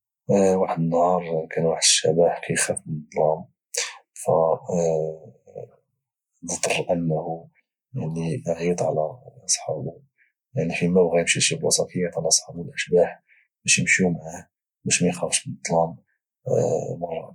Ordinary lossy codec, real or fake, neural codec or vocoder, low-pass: none; real; none; 19.8 kHz